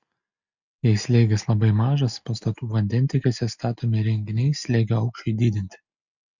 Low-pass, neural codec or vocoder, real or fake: 7.2 kHz; none; real